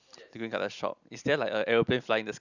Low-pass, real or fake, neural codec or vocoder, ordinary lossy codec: 7.2 kHz; real; none; none